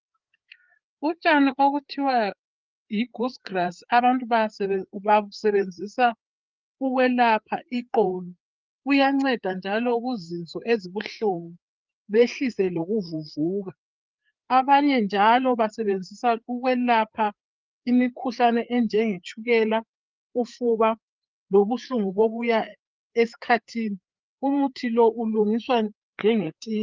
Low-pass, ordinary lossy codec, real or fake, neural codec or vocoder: 7.2 kHz; Opus, 32 kbps; fake; codec, 16 kHz, 4 kbps, FreqCodec, larger model